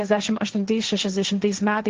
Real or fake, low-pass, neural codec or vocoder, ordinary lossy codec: fake; 7.2 kHz; codec, 16 kHz, 1.1 kbps, Voila-Tokenizer; Opus, 16 kbps